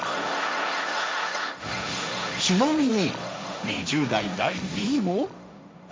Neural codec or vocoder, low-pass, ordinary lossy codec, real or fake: codec, 16 kHz, 1.1 kbps, Voila-Tokenizer; none; none; fake